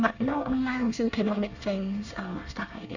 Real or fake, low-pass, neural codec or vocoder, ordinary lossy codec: fake; 7.2 kHz; codec, 24 kHz, 1 kbps, SNAC; Opus, 64 kbps